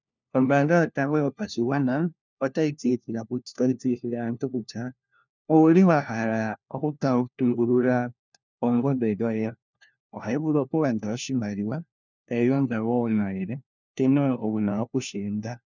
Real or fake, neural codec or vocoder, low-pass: fake; codec, 16 kHz, 1 kbps, FunCodec, trained on LibriTTS, 50 frames a second; 7.2 kHz